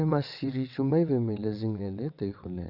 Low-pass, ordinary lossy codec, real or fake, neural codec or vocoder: 5.4 kHz; none; fake; vocoder, 22.05 kHz, 80 mel bands, WaveNeXt